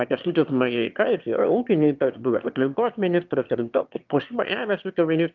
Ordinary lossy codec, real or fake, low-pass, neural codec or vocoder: Opus, 24 kbps; fake; 7.2 kHz; autoencoder, 22.05 kHz, a latent of 192 numbers a frame, VITS, trained on one speaker